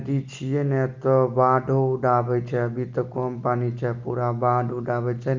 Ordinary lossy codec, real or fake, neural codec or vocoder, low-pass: Opus, 24 kbps; real; none; 7.2 kHz